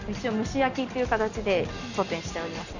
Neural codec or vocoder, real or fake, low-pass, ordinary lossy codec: none; real; 7.2 kHz; none